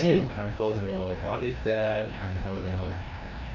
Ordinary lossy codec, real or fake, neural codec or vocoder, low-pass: MP3, 64 kbps; fake; codec, 16 kHz, 1 kbps, FreqCodec, larger model; 7.2 kHz